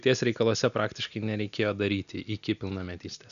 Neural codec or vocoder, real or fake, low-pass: none; real; 7.2 kHz